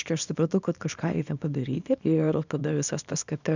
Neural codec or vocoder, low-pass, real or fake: codec, 24 kHz, 0.9 kbps, WavTokenizer, small release; 7.2 kHz; fake